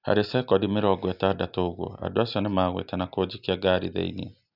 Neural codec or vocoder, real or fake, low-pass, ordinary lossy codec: none; real; 5.4 kHz; none